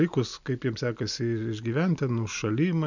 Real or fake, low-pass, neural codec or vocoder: real; 7.2 kHz; none